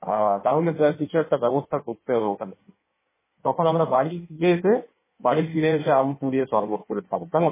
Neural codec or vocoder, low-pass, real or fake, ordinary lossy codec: codec, 16 kHz in and 24 kHz out, 1.1 kbps, FireRedTTS-2 codec; 3.6 kHz; fake; MP3, 16 kbps